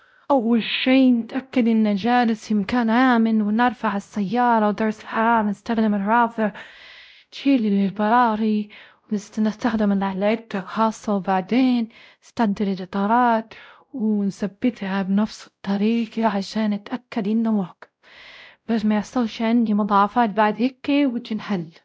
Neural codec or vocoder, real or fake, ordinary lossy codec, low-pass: codec, 16 kHz, 0.5 kbps, X-Codec, WavLM features, trained on Multilingual LibriSpeech; fake; none; none